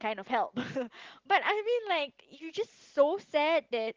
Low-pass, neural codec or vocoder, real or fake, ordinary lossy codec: 7.2 kHz; none; real; Opus, 16 kbps